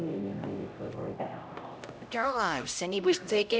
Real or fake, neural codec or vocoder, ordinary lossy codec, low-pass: fake; codec, 16 kHz, 0.5 kbps, X-Codec, HuBERT features, trained on LibriSpeech; none; none